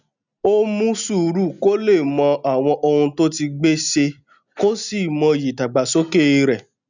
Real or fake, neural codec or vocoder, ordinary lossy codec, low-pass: real; none; none; 7.2 kHz